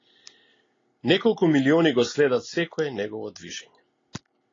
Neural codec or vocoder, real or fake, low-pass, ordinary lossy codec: none; real; 7.2 kHz; AAC, 32 kbps